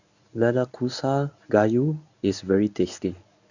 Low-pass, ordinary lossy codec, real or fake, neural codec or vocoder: 7.2 kHz; none; fake; codec, 24 kHz, 0.9 kbps, WavTokenizer, medium speech release version 1